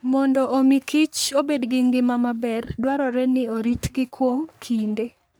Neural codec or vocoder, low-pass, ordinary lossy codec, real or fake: codec, 44.1 kHz, 3.4 kbps, Pupu-Codec; none; none; fake